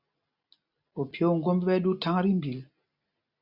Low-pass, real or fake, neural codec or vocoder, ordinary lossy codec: 5.4 kHz; real; none; Opus, 64 kbps